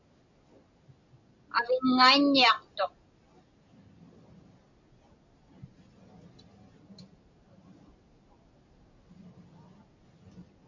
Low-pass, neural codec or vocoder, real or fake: 7.2 kHz; none; real